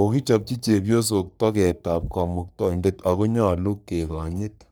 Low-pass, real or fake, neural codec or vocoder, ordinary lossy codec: none; fake; codec, 44.1 kHz, 3.4 kbps, Pupu-Codec; none